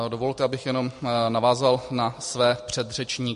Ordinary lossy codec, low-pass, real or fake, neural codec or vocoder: MP3, 48 kbps; 10.8 kHz; real; none